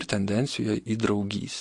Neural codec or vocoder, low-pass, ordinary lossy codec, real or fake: none; 10.8 kHz; MP3, 48 kbps; real